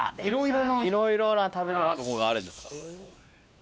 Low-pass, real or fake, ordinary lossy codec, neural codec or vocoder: none; fake; none; codec, 16 kHz, 2 kbps, X-Codec, WavLM features, trained on Multilingual LibriSpeech